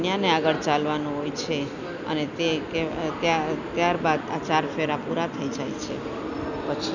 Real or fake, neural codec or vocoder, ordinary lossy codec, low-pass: real; none; none; 7.2 kHz